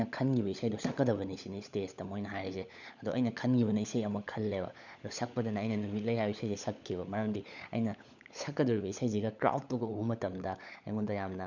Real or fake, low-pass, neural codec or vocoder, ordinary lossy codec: fake; 7.2 kHz; codec, 16 kHz, 16 kbps, FunCodec, trained on LibriTTS, 50 frames a second; none